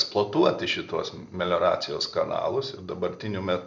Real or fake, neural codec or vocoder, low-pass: real; none; 7.2 kHz